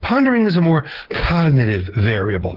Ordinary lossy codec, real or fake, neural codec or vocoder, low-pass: Opus, 16 kbps; fake; codec, 16 kHz, 8 kbps, FreqCodec, smaller model; 5.4 kHz